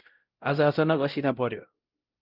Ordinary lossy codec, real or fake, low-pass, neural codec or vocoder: Opus, 24 kbps; fake; 5.4 kHz; codec, 16 kHz, 0.5 kbps, X-Codec, WavLM features, trained on Multilingual LibriSpeech